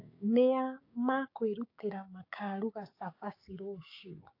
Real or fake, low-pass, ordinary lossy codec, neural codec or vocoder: fake; 5.4 kHz; AAC, 32 kbps; codec, 16 kHz, 6 kbps, DAC